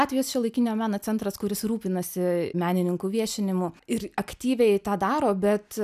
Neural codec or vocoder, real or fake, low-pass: none; real; 14.4 kHz